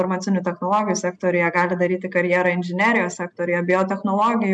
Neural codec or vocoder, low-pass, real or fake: none; 9.9 kHz; real